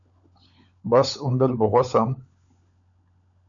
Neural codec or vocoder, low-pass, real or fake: codec, 16 kHz, 16 kbps, FunCodec, trained on LibriTTS, 50 frames a second; 7.2 kHz; fake